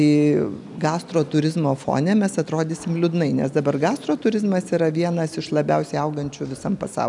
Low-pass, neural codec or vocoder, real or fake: 10.8 kHz; none; real